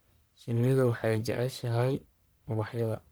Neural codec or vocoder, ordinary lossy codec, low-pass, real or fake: codec, 44.1 kHz, 1.7 kbps, Pupu-Codec; none; none; fake